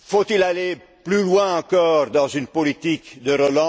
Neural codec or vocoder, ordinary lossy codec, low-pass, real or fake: none; none; none; real